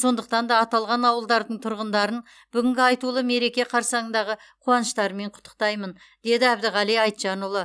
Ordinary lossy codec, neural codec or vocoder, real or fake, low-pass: none; none; real; none